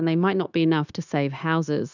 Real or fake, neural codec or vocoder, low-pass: fake; codec, 16 kHz, 0.9 kbps, LongCat-Audio-Codec; 7.2 kHz